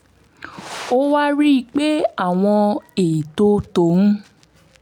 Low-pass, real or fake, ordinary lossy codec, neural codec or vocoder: 19.8 kHz; real; none; none